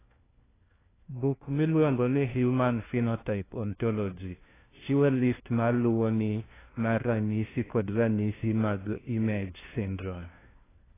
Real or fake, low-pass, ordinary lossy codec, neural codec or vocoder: fake; 3.6 kHz; AAC, 16 kbps; codec, 16 kHz, 1 kbps, FunCodec, trained on LibriTTS, 50 frames a second